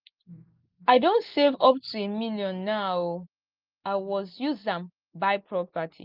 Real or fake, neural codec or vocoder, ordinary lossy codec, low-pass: real; none; Opus, 32 kbps; 5.4 kHz